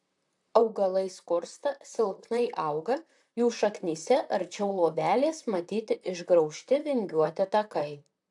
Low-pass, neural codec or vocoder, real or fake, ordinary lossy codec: 10.8 kHz; vocoder, 44.1 kHz, 128 mel bands, Pupu-Vocoder; fake; AAC, 64 kbps